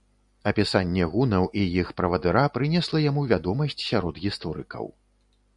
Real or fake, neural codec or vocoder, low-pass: real; none; 10.8 kHz